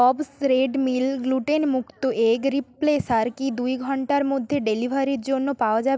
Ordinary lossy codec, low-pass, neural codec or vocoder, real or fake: none; none; none; real